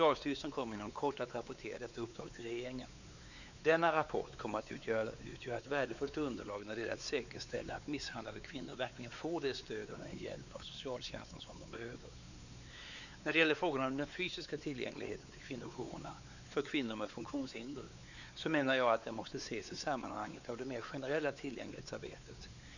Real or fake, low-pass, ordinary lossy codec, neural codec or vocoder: fake; 7.2 kHz; none; codec, 16 kHz, 4 kbps, X-Codec, WavLM features, trained on Multilingual LibriSpeech